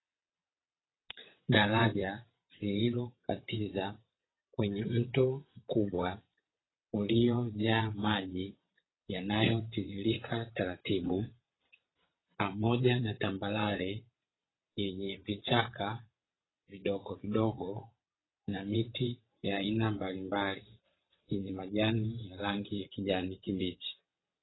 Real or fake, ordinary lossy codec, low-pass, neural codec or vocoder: fake; AAC, 16 kbps; 7.2 kHz; vocoder, 22.05 kHz, 80 mel bands, WaveNeXt